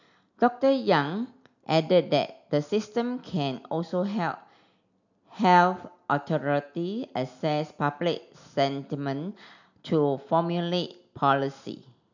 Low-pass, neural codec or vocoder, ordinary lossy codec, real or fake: 7.2 kHz; none; none; real